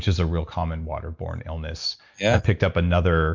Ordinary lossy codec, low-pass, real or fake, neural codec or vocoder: MP3, 64 kbps; 7.2 kHz; real; none